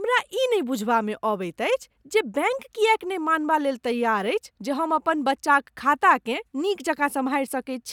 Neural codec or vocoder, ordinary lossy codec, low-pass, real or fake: none; none; 19.8 kHz; real